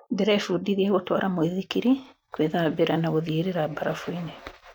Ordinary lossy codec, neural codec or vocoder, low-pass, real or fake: none; none; 19.8 kHz; real